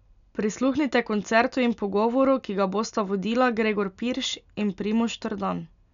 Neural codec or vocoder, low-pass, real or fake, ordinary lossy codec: none; 7.2 kHz; real; none